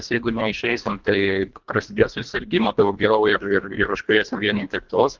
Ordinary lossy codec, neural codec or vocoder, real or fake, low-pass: Opus, 24 kbps; codec, 24 kHz, 1.5 kbps, HILCodec; fake; 7.2 kHz